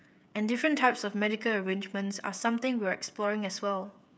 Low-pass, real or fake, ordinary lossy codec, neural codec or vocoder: none; fake; none; codec, 16 kHz, 16 kbps, FreqCodec, smaller model